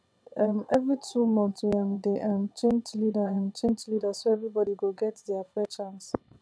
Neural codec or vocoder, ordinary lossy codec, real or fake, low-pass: vocoder, 22.05 kHz, 80 mel bands, WaveNeXt; none; fake; none